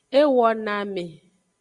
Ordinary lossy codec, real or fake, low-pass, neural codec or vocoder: Opus, 64 kbps; real; 10.8 kHz; none